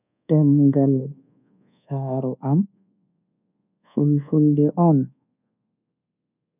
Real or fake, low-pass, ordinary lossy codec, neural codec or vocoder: fake; 3.6 kHz; none; codec, 24 kHz, 1.2 kbps, DualCodec